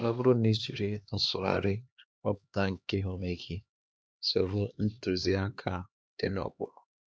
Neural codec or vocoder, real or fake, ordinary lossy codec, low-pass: codec, 16 kHz, 2 kbps, X-Codec, HuBERT features, trained on LibriSpeech; fake; none; none